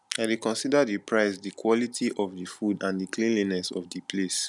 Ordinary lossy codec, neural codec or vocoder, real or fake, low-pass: none; none; real; 10.8 kHz